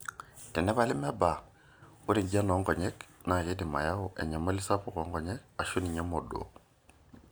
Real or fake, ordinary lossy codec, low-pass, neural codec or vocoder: real; none; none; none